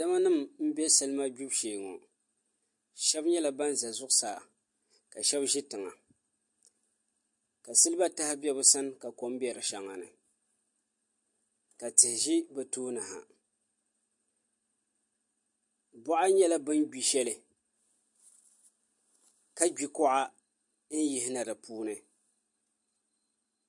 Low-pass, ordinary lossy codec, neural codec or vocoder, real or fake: 10.8 kHz; MP3, 48 kbps; none; real